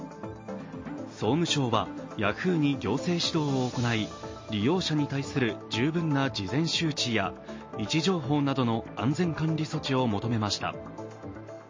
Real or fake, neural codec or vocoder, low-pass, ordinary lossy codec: real; none; 7.2 kHz; MP3, 32 kbps